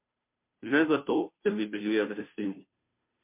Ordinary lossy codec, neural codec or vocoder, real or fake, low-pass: MP3, 32 kbps; codec, 16 kHz, 0.5 kbps, FunCodec, trained on Chinese and English, 25 frames a second; fake; 3.6 kHz